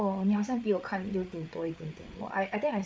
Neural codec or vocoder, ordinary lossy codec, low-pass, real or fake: codec, 16 kHz, 8 kbps, FreqCodec, larger model; none; none; fake